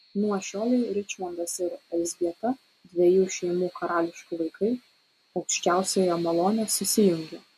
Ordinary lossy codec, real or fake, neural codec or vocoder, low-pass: MP3, 64 kbps; real; none; 14.4 kHz